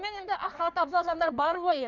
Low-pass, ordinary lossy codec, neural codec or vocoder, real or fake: 7.2 kHz; none; codec, 16 kHz in and 24 kHz out, 1.1 kbps, FireRedTTS-2 codec; fake